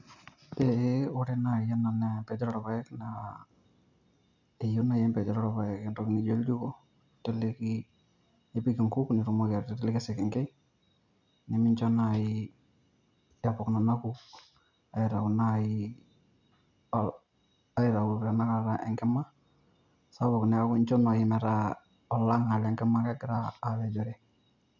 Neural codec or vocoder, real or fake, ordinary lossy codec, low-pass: none; real; none; 7.2 kHz